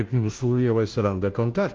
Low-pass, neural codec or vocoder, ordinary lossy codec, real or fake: 7.2 kHz; codec, 16 kHz, 0.5 kbps, FunCodec, trained on Chinese and English, 25 frames a second; Opus, 32 kbps; fake